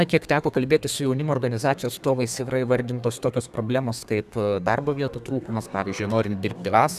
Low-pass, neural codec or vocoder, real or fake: 14.4 kHz; codec, 32 kHz, 1.9 kbps, SNAC; fake